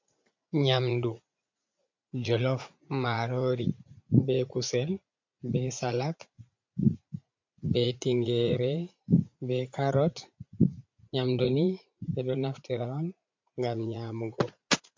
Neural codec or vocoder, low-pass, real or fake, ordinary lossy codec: vocoder, 44.1 kHz, 80 mel bands, Vocos; 7.2 kHz; fake; MP3, 48 kbps